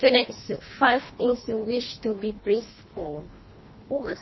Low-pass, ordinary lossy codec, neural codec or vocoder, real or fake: 7.2 kHz; MP3, 24 kbps; codec, 24 kHz, 1.5 kbps, HILCodec; fake